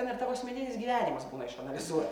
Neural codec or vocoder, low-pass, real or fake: none; 19.8 kHz; real